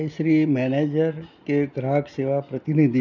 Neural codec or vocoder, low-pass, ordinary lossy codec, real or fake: none; 7.2 kHz; none; real